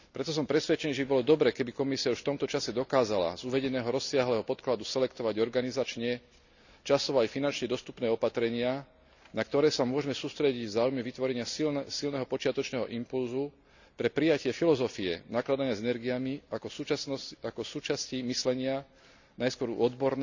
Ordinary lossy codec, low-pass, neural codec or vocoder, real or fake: none; 7.2 kHz; none; real